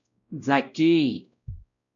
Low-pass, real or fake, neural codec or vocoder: 7.2 kHz; fake; codec, 16 kHz, 0.5 kbps, X-Codec, WavLM features, trained on Multilingual LibriSpeech